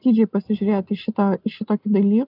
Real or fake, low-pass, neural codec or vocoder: real; 5.4 kHz; none